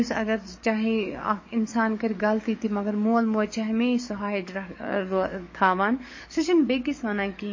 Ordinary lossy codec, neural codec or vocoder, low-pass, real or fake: MP3, 32 kbps; codec, 44.1 kHz, 7.8 kbps, DAC; 7.2 kHz; fake